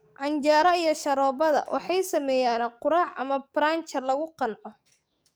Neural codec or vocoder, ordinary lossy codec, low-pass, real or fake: codec, 44.1 kHz, 7.8 kbps, DAC; none; none; fake